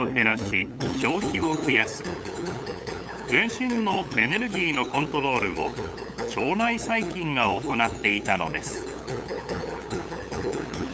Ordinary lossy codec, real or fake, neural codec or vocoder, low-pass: none; fake; codec, 16 kHz, 8 kbps, FunCodec, trained on LibriTTS, 25 frames a second; none